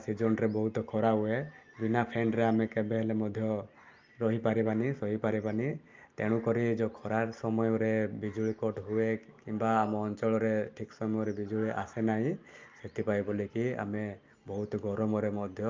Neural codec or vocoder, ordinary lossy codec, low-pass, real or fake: none; Opus, 32 kbps; 7.2 kHz; real